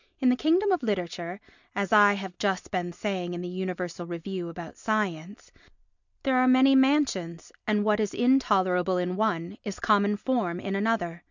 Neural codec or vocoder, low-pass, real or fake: none; 7.2 kHz; real